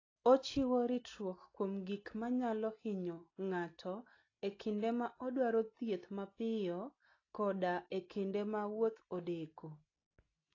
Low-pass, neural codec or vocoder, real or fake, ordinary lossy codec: 7.2 kHz; none; real; AAC, 32 kbps